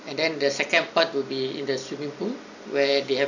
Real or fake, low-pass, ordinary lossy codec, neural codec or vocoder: real; 7.2 kHz; none; none